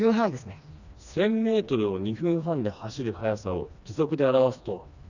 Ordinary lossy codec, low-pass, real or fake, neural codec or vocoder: none; 7.2 kHz; fake; codec, 16 kHz, 2 kbps, FreqCodec, smaller model